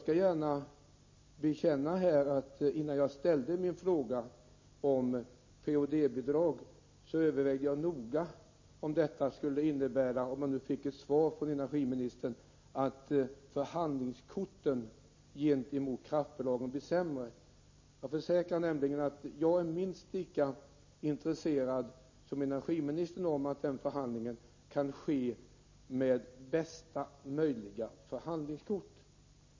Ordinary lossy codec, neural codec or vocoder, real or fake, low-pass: MP3, 32 kbps; none; real; 7.2 kHz